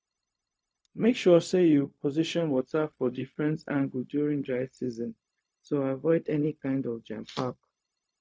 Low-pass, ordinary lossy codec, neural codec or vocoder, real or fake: none; none; codec, 16 kHz, 0.4 kbps, LongCat-Audio-Codec; fake